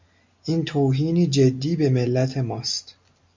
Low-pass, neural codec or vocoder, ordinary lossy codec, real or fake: 7.2 kHz; none; MP3, 48 kbps; real